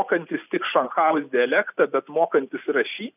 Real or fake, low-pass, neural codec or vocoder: real; 3.6 kHz; none